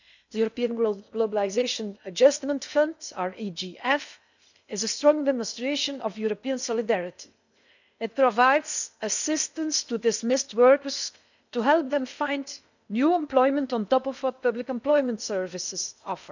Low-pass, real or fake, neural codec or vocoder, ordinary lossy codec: 7.2 kHz; fake; codec, 16 kHz in and 24 kHz out, 0.8 kbps, FocalCodec, streaming, 65536 codes; none